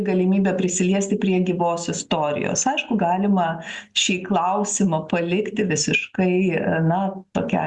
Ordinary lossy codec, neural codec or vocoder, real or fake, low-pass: Opus, 64 kbps; none; real; 10.8 kHz